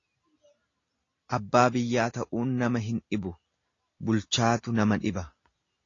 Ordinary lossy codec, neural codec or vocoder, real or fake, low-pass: AAC, 32 kbps; none; real; 7.2 kHz